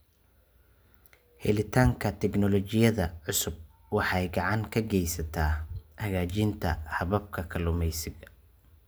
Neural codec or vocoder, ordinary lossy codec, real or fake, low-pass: none; none; real; none